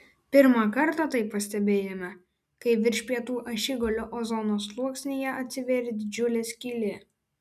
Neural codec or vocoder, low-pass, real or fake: none; 14.4 kHz; real